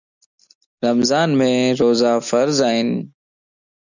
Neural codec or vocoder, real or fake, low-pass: none; real; 7.2 kHz